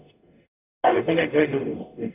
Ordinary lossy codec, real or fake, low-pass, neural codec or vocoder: none; fake; 3.6 kHz; codec, 44.1 kHz, 0.9 kbps, DAC